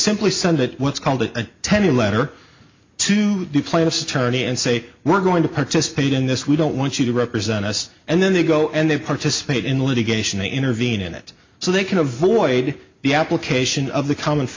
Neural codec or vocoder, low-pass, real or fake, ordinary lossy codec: none; 7.2 kHz; real; MP3, 64 kbps